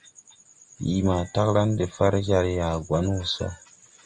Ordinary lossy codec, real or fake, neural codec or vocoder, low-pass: Opus, 32 kbps; real; none; 9.9 kHz